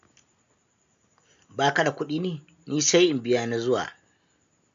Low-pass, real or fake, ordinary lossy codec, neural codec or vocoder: 7.2 kHz; real; MP3, 64 kbps; none